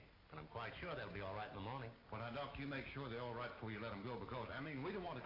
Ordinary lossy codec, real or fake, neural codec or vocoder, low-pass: AAC, 24 kbps; real; none; 5.4 kHz